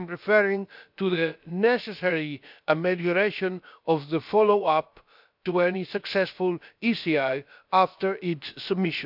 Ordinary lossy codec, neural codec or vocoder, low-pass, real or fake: none; codec, 16 kHz, about 1 kbps, DyCAST, with the encoder's durations; 5.4 kHz; fake